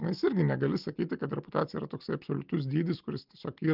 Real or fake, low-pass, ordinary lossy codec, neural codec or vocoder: real; 5.4 kHz; Opus, 24 kbps; none